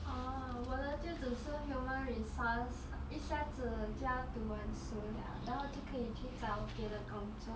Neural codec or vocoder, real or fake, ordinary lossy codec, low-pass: none; real; none; none